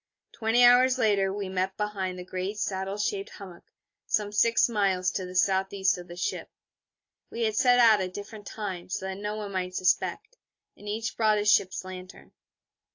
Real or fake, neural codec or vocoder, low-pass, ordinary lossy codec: real; none; 7.2 kHz; AAC, 48 kbps